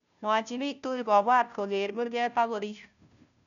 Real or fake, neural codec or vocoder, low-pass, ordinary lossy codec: fake; codec, 16 kHz, 0.5 kbps, FunCodec, trained on Chinese and English, 25 frames a second; 7.2 kHz; none